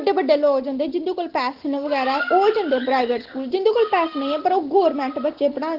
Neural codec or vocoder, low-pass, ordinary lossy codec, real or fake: none; 5.4 kHz; Opus, 16 kbps; real